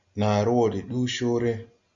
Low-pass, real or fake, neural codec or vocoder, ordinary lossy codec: 7.2 kHz; real; none; Opus, 64 kbps